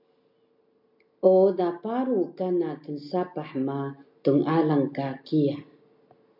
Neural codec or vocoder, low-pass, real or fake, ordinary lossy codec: none; 5.4 kHz; real; MP3, 48 kbps